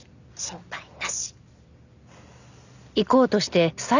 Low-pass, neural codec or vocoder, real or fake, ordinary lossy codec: 7.2 kHz; none; real; none